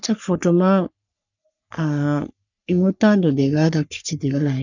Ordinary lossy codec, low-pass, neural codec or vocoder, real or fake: none; 7.2 kHz; codec, 44.1 kHz, 3.4 kbps, Pupu-Codec; fake